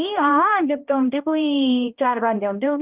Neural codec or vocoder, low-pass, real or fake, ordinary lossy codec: codec, 16 kHz, 1 kbps, X-Codec, HuBERT features, trained on balanced general audio; 3.6 kHz; fake; Opus, 24 kbps